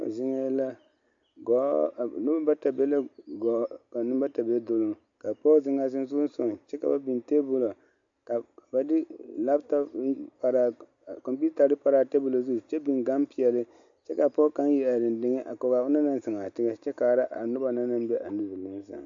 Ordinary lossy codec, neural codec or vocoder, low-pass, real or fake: AAC, 64 kbps; none; 7.2 kHz; real